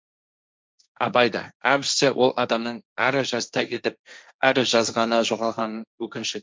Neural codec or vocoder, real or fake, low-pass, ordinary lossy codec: codec, 16 kHz, 1.1 kbps, Voila-Tokenizer; fake; none; none